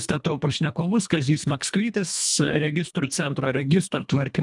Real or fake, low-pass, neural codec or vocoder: fake; 10.8 kHz; codec, 24 kHz, 1.5 kbps, HILCodec